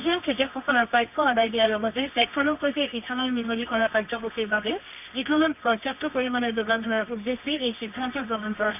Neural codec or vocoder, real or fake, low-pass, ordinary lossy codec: codec, 24 kHz, 0.9 kbps, WavTokenizer, medium music audio release; fake; 3.6 kHz; none